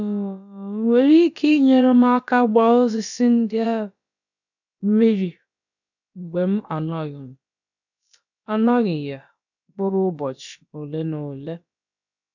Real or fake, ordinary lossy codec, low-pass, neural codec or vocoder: fake; none; 7.2 kHz; codec, 16 kHz, about 1 kbps, DyCAST, with the encoder's durations